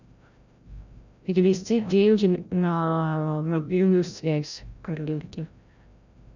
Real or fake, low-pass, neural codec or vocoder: fake; 7.2 kHz; codec, 16 kHz, 0.5 kbps, FreqCodec, larger model